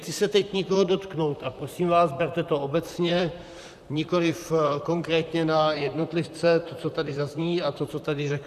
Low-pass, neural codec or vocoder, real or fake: 14.4 kHz; vocoder, 44.1 kHz, 128 mel bands, Pupu-Vocoder; fake